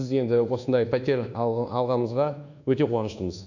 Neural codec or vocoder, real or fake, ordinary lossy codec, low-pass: codec, 24 kHz, 1.2 kbps, DualCodec; fake; none; 7.2 kHz